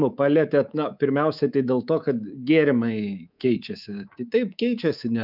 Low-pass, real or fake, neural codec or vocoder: 5.4 kHz; fake; codec, 24 kHz, 3.1 kbps, DualCodec